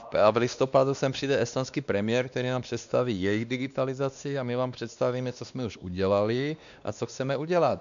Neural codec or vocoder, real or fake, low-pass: codec, 16 kHz, 2 kbps, X-Codec, WavLM features, trained on Multilingual LibriSpeech; fake; 7.2 kHz